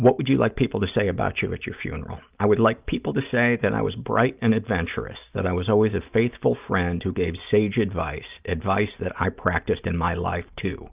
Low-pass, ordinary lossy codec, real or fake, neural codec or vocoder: 3.6 kHz; Opus, 24 kbps; real; none